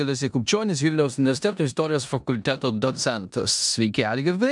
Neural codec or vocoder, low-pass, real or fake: codec, 16 kHz in and 24 kHz out, 0.9 kbps, LongCat-Audio-Codec, four codebook decoder; 10.8 kHz; fake